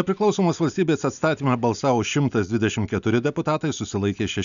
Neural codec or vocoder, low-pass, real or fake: none; 7.2 kHz; real